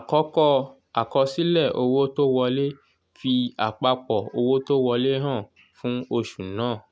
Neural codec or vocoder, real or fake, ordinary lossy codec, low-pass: none; real; none; none